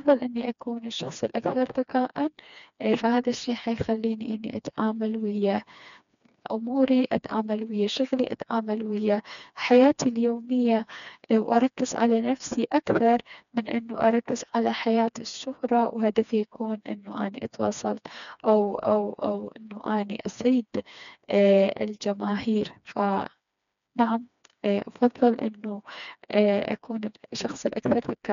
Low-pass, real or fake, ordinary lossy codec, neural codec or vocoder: 7.2 kHz; fake; none; codec, 16 kHz, 2 kbps, FreqCodec, smaller model